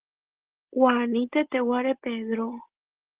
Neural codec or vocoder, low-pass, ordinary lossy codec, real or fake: none; 3.6 kHz; Opus, 16 kbps; real